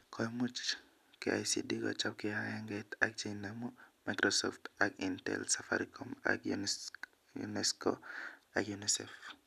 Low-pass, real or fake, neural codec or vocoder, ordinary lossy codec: 14.4 kHz; real; none; none